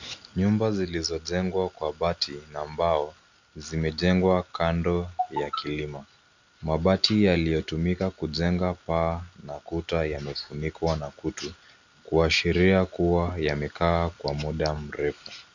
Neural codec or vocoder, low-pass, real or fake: none; 7.2 kHz; real